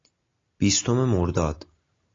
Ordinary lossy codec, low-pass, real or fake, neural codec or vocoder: AAC, 32 kbps; 7.2 kHz; real; none